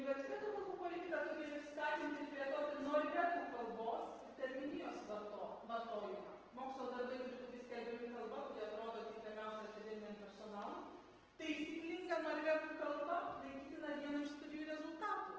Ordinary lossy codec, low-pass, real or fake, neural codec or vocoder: Opus, 16 kbps; 7.2 kHz; real; none